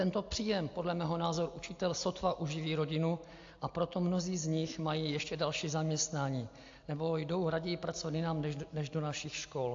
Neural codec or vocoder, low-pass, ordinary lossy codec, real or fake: none; 7.2 kHz; AAC, 48 kbps; real